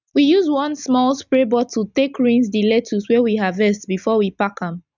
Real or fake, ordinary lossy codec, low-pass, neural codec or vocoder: real; none; 7.2 kHz; none